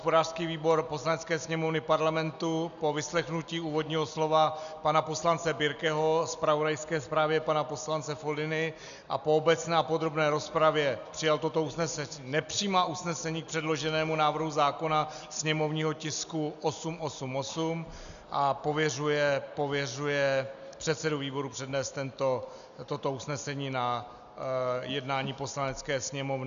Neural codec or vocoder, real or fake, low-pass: none; real; 7.2 kHz